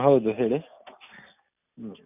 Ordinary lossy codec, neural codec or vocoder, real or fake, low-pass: none; none; real; 3.6 kHz